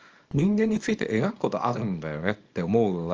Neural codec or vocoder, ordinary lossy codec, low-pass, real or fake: codec, 24 kHz, 0.9 kbps, WavTokenizer, small release; Opus, 24 kbps; 7.2 kHz; fake